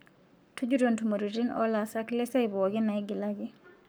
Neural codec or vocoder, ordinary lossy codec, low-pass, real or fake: codec, 44.1 kHz, 7.8 kbps, Pupu-Codec; none; none; fake